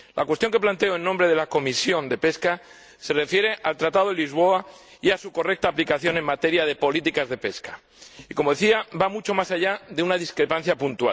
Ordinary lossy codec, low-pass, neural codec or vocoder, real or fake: none; none; none; real